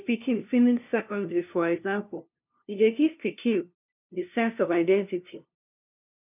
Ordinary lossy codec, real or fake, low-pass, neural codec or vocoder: none; fake; 3.6 kHz; codec, 16 kHz, 0.5 kbps, FunCodec, trained on LibriTTS, 25 frames a second